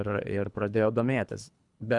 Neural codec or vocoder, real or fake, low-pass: codec, 24 kHz, 3 kbps, HILCodec; fake; 10.8 kHz